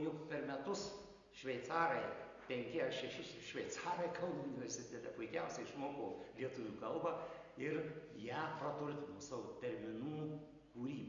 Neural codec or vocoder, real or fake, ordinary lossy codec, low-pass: none; real; Opus, 64 kbps; 7.2 kHz